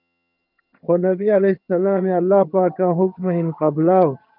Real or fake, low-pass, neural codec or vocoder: fake; 5.4 kHz; vocoder, 22.05 kHz, 80 mel bands, HiFi-GAN